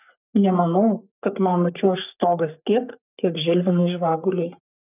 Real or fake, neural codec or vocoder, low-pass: fake; codec, 44.1 kHz, 3.4 kbps, Pupu-Codec; 3.6 kHz